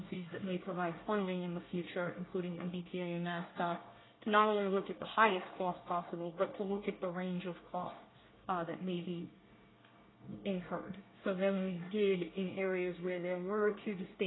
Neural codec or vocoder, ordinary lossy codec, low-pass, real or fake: codec, 24 kHz, 1 kbps, SNAC; AAC, 16 kbps; 7.2 kHz; fake